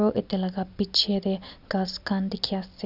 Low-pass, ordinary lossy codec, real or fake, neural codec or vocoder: 5.4 kHz; none; real; none